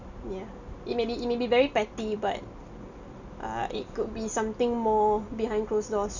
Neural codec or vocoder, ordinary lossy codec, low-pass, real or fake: none; none; 7.2 kHz; real